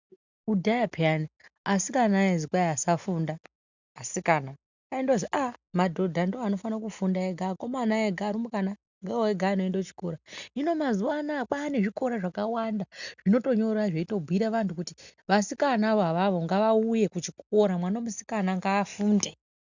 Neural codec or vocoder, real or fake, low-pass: none; real; 7.2 kHz